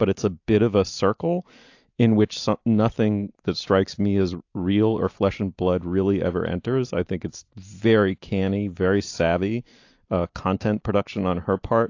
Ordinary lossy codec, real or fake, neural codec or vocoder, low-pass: AAC, 48 kbps; real; none; 7.2 kHz